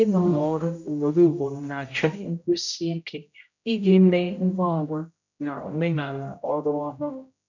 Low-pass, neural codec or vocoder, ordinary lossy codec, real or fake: 7.2 kHz; codec, 16 kHz, 0.5 kbps, X-Codec, HuBERT features, trained on general audio; none; fake